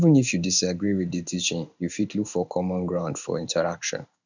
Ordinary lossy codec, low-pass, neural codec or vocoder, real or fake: none; 7.2 kHz; codec, 16 kHz in and 24 kHz out, 1 kbps, XY-Tokenizer; fake